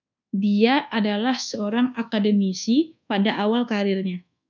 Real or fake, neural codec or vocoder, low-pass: fake; codec, 24 kHz, 1.2 kbps, DualCodec; 7.2 kHz